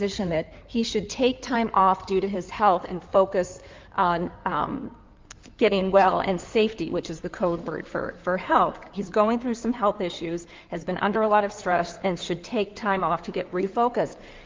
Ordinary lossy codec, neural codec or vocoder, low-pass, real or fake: Opus, 32 kbps; codec, 16 kHz in and 24 kHz out, 2.2 kbps, FireRedTTS-2 codec; 7.2 kHz; fake